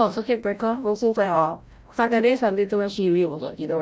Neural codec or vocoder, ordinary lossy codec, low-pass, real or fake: codec, 16 kHz, 0.5 kbps, FreqCodec, larger model; none; none; fake